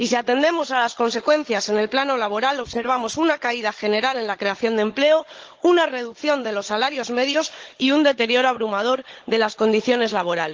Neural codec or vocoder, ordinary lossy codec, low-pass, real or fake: codec, 16 kHz, 16 kbps, FunCodec, trained on Chinese and English, 50 frames a second; Opus, 16 kbps; 7.2 kHz; fake